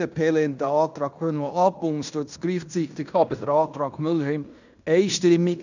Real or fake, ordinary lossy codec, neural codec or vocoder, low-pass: fake; none; codec, 16 kHz in and 24 kHz out, 0.9 kbps, LongCat-Audio-Codec, fine tuned four codebook decoder; 7.2 kHz